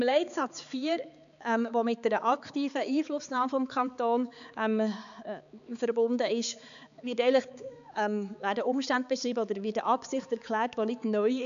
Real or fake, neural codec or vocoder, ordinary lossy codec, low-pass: fake; codec, 16 kHz, 4 kbps, X-Codec, HuBERT features, trained on balanced general audio; AAC, 96 kbps; 7.2 kHz